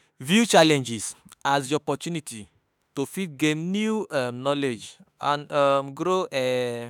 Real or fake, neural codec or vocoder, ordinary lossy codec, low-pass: fake; autoencoder, 48 kHz, 32 numbers a frame, DAC-VAE, trained on Japanese speech; none; none